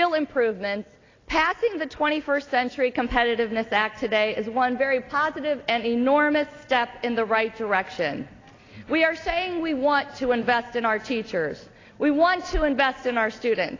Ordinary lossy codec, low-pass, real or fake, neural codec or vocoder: AAC, 32 kbps; 7.2 kHz; real; none